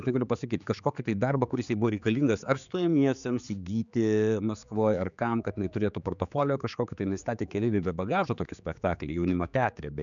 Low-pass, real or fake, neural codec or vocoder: 7.2 kHz; fake; codec, 16 kHz, 4 kbps, X-Codec, HuBERT features, trained on general audio